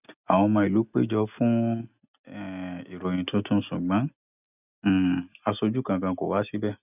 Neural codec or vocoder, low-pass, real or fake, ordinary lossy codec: none; 3.6 kHz; real; none